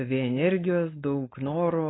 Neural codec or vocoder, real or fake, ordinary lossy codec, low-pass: codec, 16 kHz, 4.8 kbps, FACodec; fake; AAC, 16 kbps; 7.2 kHz